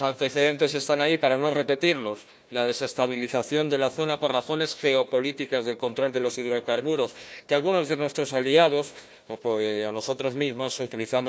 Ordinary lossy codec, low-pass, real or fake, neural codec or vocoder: none; none; fake; codec, 16 kHz, 1 kbps, FunCodec, trained on Chinese and English, 50 frames a second